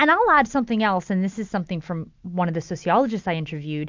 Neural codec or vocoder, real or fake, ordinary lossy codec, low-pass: none; real; MP3, 64 kbps; 7.2 kHz